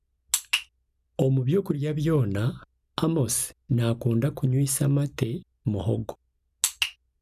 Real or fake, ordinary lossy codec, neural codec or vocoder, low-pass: real; none; none; 14.4 kHz